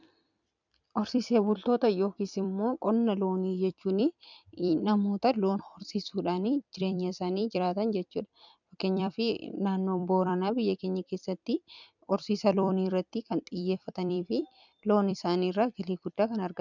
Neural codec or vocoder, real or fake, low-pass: vocoder, 44.1 kHz, 128 mel bands every 256 samples, BigVGAN v2; fake; 7.2 kHz